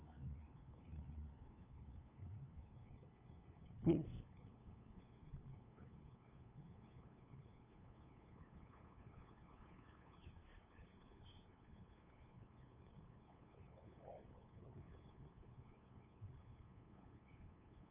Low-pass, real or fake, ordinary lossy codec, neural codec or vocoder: 3.6 kHz; fake; none; codec, 16 kHz, 2 kbps, FunCodec, trained on LibriTTS, 25 frames a second